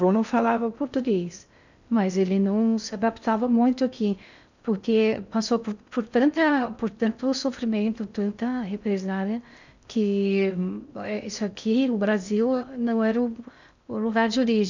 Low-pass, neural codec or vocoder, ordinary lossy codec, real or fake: 7.2 kHz; codec, 16 kHz in and 24 kHz out, 0.6 kbps, FocalCodec, streaming, 2048 codes; none; fake